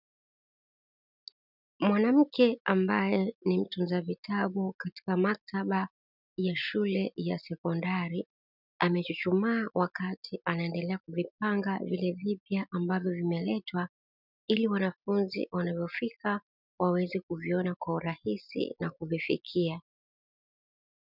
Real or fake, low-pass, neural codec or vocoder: real; 5.4 kHz; none